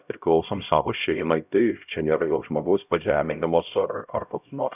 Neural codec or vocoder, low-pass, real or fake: codec, 16 kHz, 0.5 kbps, X-Codec, HuBERT features, trained on LibriSpeech; 3.6 kHz; fake